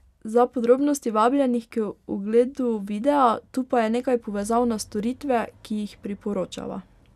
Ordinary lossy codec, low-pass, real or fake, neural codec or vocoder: none; 14.4 kHz; real; none